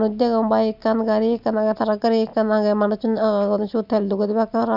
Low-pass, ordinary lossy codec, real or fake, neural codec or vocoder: 5.4 kHz; none; real; none